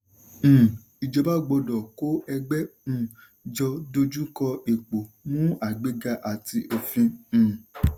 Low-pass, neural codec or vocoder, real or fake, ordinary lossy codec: none; none; real; none